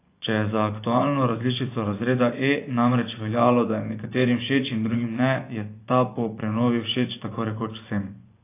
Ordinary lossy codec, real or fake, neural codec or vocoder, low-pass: AAC, 24 kbps; fake; vocoder, 24 kHz, 100 mel bands, Vocos; 3.6 kHz